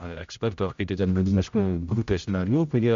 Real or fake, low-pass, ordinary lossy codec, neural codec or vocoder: fake; 7.2 kHz; MP3, 64 kbps; codec, 16 kHz, 0.5 kbps, X-Codec, HuBERT features, trained on general audio